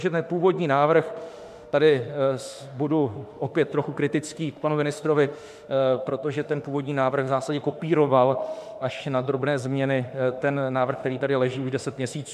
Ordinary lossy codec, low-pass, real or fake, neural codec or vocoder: MP3, 96 kbps; 14.4 kHz; fake; autoencoder, 48 kHz, 32 numbers a frame, DAC-VAE, trained on Japanese speech